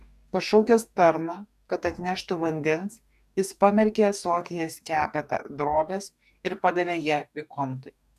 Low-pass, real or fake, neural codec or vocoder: 14.4 kHz; fake; codec, 44.1 kHz, 2.6 kbps, DAC